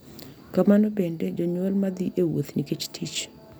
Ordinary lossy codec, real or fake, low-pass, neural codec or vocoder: none; real; none; none